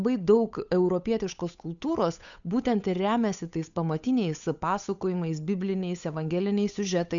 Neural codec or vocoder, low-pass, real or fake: codec, 16 kHz, 8 kbps, FunCodec, trained on Chinese and English, 25 frames a second; 7.2 kHz; fake